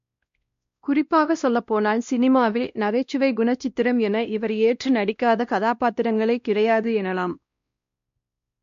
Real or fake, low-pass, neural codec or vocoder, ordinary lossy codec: fake; 7.2 kHz; codec, 16 kHz, 1 kbps, X-Codec, WavLM features, trained on Multilingual LibriSpeech; MP3, 48 kbps